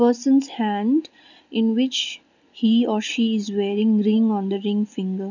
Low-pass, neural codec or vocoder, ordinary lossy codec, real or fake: 7.2 kHz; none; none; real